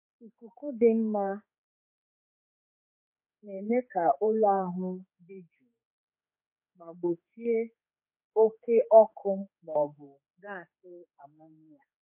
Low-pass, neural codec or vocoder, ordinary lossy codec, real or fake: 3.6 kHz; codec, 16 kHz, 4 kbps, X-Codec, HuBERT features, trained on general audio; MP3, 24 kbps; fake